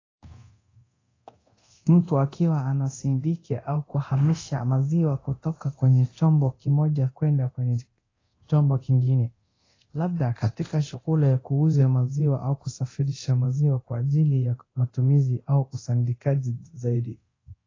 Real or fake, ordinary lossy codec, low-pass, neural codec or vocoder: fake; AAC, 32 kbps; 7.2 kHz; codec, 24 kHz, 0.9 kbps, DualCodec